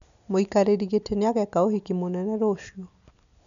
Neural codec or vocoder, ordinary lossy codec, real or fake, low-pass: none; none; real; 7.2 kHz